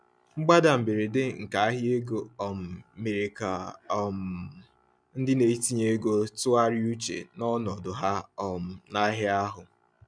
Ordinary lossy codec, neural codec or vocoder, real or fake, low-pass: none; none; real; 9.9 kHz